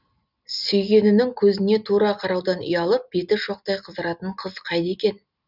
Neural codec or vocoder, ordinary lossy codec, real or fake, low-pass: none; none; real; 5.4 kHz